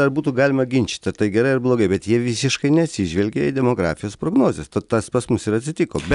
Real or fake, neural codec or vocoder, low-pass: real; none; 10.8 kHz